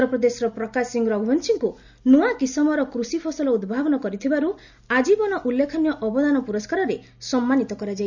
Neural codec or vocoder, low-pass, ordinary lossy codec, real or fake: none; 7.2 kHz; none; real